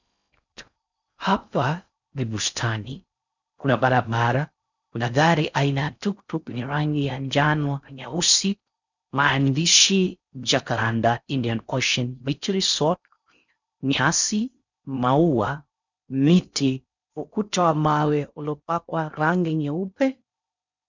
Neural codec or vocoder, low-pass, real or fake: codec, 16 kHz in and 24 kHz out, 0.6 kbps, FocalCodec, streaming, 4096 codes; 7.2 kHz; fake